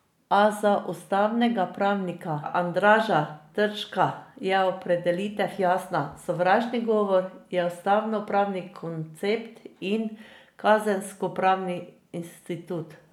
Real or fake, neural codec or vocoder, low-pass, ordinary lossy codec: real; none; 19.8 kHz; none